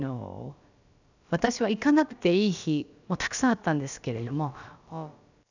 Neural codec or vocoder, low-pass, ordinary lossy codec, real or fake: codec, 16 kHz, about 1 kbps, DyCAST, with the encoder's durations; 7.2 kHz; none; fake